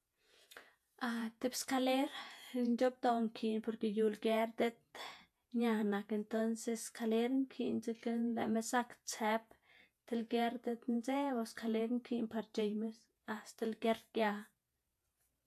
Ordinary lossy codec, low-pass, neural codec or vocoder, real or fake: AAC, 96 kbps; 14.4 kHz; vocoder, 48 kHz, 128 mel bands, Vocos; fake